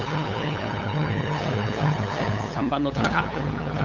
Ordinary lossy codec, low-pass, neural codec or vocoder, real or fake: none; 7.2 kHz; codec, 16 kHz, 8 kbps, FunCodec, trained on LibriTTS, 25 frames a second; fake